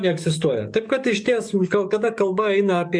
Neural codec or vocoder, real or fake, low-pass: codec, 44.1 kHz, 7.8 kbps, DAC; fake; 9.9 kHz